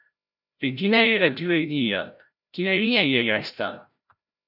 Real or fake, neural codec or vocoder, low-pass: fake; codec, 16 kHz, 0.5 kbps, FreqCodec, larger model; 5.4 kHz